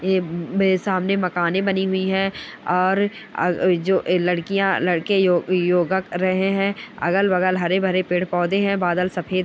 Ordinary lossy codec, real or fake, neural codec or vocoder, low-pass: none; real; none; none